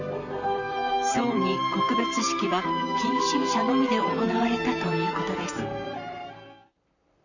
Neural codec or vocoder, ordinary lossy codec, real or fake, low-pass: vocoder, 44.1 kHz, 128 mel bands, Pupu-Vocoder; none; fake; 7.2 kHz